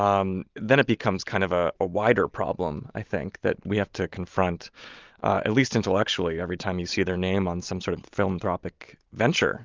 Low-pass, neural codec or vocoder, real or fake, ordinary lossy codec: 7.2 kHz; none; real; Opus, 32 kbps